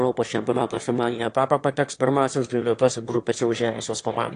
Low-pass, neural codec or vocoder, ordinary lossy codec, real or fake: 9.9 kHz; autoencoder, 22.05 kHz, a latent of 192 numbers a frame, VITS, trained on one speaker; AAC, 48 kbps; fake